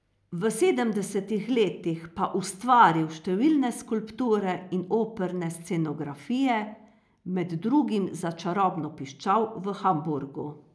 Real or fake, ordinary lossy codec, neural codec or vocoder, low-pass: real; none; none; none